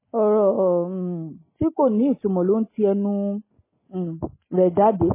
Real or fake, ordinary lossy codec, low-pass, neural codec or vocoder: real; MP3, 16 kbps; 3.6 kHz; none